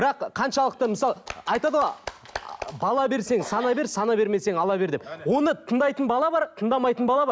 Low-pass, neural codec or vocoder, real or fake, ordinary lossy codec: none; none; real; none